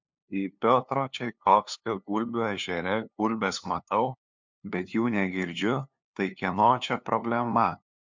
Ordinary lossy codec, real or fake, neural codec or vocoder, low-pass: MP3, 64 kbps; fake; codec, 16 kHz, 2 kbps, FunCodec, trained on LibriTTS, 25 frames a second; 7.2 kHz